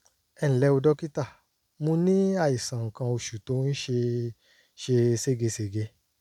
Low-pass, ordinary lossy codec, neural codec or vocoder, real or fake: 19.8 kHz; none; none; real